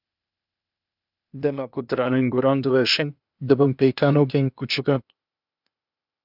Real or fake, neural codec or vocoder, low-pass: fake; codec, 16 kHz, 0.8 kbps, ZipCodec; 5.4 kHz